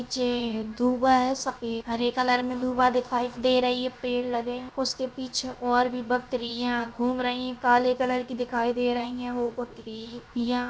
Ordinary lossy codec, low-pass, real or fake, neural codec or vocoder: none; none; fake; codec, 16 kHz, 0.7 kbps, FocalCodec